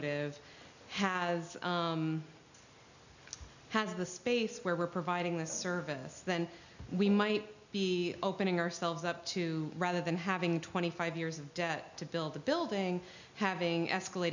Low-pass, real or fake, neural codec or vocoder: 7.2 kHz; real; none